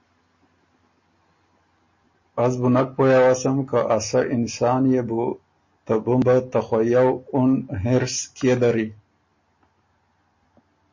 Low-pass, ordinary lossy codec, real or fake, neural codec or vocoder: 7.2 kHz; MP3, 32 kbps; real; none